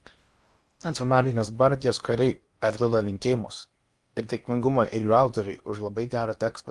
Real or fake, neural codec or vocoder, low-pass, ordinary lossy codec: fake; codec, 16 kHz in and 24 kHz out, 0.8 kbps, FocalCodec, streaming, 65536 codes; 10.8 kHz; Opus, 32 kbps